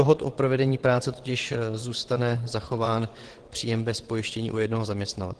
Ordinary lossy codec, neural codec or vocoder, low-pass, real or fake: Opus, 16 kbps; vocoder, 22.05 kHz, 80 mel bands, WaveNeXt; 9.9 kHz; fake